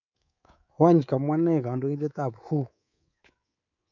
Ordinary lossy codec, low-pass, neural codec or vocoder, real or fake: none; 7.2 kHz; codec, 24 kHz, 3.1 kbps, DualCodec; fake